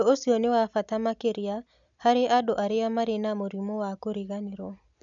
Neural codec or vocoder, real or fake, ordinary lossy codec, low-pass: none; real; MP3, 96 kbps; 7.2 kHz